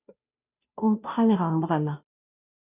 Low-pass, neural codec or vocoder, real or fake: 3.6 kHz; codec, 16 kHz, 0.5 kbps, FunCodec, trained on Chinese and English, 25 frames a second; fake